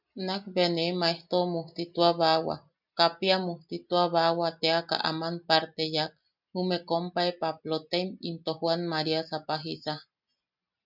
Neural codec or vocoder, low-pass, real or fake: none; 5.4 kHz; real